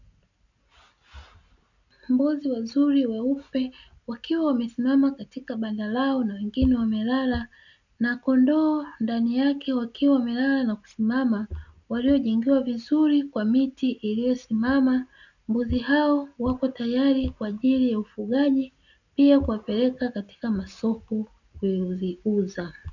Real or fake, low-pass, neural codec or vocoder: real; 7.2 kHz; none